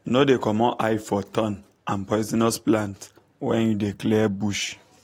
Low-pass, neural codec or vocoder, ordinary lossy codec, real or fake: 19.8 kHz; none; AAC, 48 kbps; real